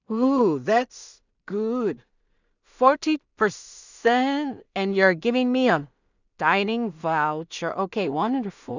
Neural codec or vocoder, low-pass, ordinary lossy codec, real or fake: codec, 16 kHz in and 24 kHz out, 0.4 kbps, LongCat-Audio-Codec, two codebook decoder; 7.2 kHz; none; fake